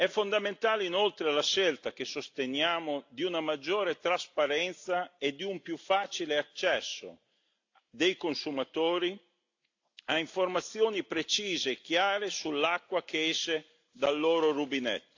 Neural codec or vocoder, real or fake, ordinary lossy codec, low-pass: none; real; AAC, 48 kbps; 7.2 kHz